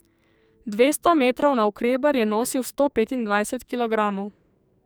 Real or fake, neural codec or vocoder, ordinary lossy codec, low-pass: fake; codec, 44.1 kHz, 2.6 kbps, SNAC; none; none